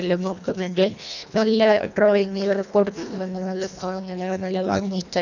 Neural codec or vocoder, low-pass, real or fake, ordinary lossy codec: codec, 24 kHz, 1.5 kbps, HILCodec; 7.2 kHz; fake; none